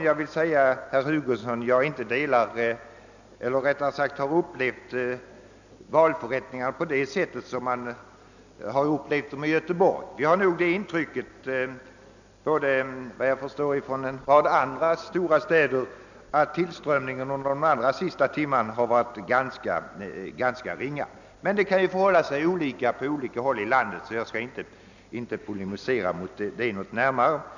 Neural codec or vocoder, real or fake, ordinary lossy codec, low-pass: none; real; none; 7.2 kHz